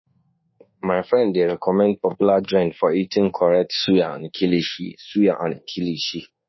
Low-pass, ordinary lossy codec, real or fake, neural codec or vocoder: 7.2 kHz; MP3, 24 kbps; fake; codec, 24 kHz, 1.2 kbps, DualCodec